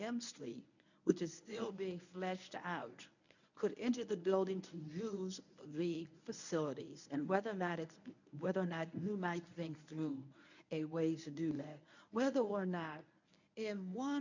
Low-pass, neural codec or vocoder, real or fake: 7.2 kHz; codec, 24 kHz, 0.9 kbps, WavTokenizer, medium speech release version 1; fake